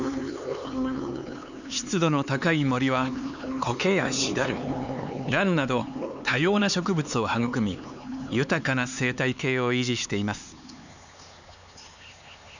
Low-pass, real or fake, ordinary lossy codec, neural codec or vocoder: 7.2 kHz; fake; none; codec, 16 kHz, 4 kbps, X-Codec, HuBERT features, trained on LibriSpeech